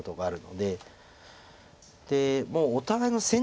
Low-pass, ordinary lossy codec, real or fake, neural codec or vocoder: none; none; real; none